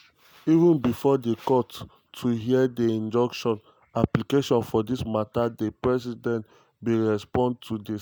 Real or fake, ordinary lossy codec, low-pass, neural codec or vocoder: real; none; none; none